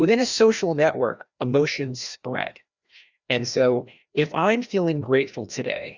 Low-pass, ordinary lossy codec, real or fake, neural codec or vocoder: 7.2 kHz; Opus, 64 kbps; fake; codec, 16 kHz, 1 kbps, FreqCodec, larger model